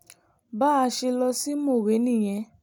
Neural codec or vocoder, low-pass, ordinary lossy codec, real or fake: none; none; none; real